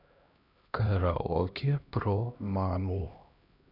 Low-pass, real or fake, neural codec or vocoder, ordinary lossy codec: 5.4 kHz; fake; codec, 16 kHz, 1 kbps, X-Codec, HuBERT features, trained on LibriSpeech; none